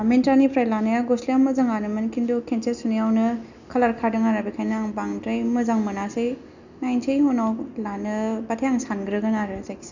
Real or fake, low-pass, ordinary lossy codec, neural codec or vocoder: real; 7.2 kHz; none; none